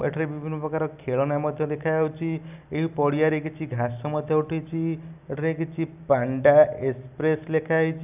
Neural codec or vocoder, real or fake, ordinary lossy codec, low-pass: none; real; none; 3.6 kHz